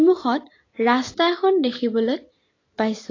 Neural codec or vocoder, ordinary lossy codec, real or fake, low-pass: none; AAC, 32 kbps; real; 7.2 kHz